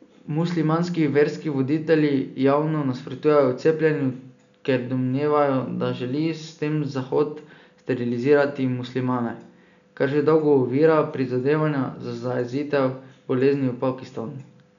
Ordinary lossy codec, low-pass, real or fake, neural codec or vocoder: none; 7.2 kHz; real; none